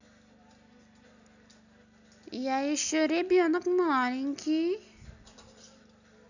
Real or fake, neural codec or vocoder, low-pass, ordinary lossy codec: real; none; 7.2 kHz; none